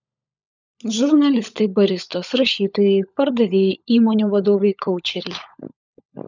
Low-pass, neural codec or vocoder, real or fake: 7.2 kHz; codec, 16 kHz, 16 kbps, FunCodec, trained on LibriTTS, 50 frames a second; fake